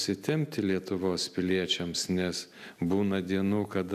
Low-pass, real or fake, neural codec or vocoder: 14.4 kHz; real; none